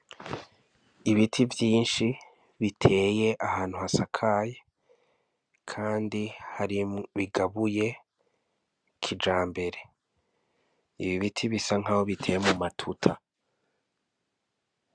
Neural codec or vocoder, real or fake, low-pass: none; real; 9.9 kHz